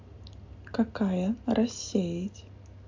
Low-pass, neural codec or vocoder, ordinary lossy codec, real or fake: 7.2 kHz; none; AAC, 48 kbps; real